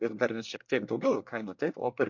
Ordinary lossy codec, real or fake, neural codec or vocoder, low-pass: MP3, 48 kbps; fake; codec, 24 kHz, 1 kbps, SNAC; 7.2 kHz